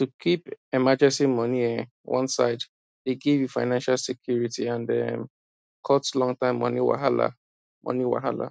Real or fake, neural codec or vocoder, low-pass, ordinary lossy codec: real; none; none; none